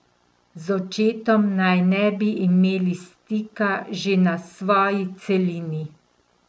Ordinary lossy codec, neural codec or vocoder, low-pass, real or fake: none; none; none; real